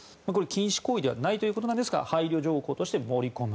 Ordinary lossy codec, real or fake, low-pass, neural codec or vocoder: none; real; none; none